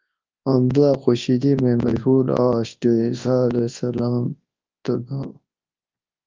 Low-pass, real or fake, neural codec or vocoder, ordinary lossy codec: 7.2 kHz; fake; codec, 24 kHz, 0.9 kbps, WavTokenizer, large speech release; Opus, 24 kbps